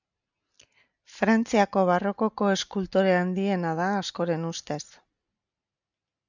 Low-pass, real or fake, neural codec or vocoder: 7.2 kHz; real; none